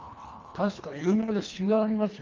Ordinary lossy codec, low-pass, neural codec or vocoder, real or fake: Opus, 32 kbps; 7.2 kHz; codec, 24 kHz, 1.5 kbps, HILCodec; fake